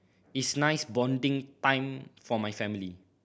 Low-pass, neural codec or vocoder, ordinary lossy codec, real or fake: none; none; none; real